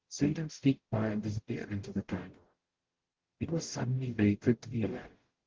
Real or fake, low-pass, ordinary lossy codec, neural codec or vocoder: fake; 7.2 kHz; Opus, 16 kbps; codec, 44.1 kHz, 0.9 kbps, DAC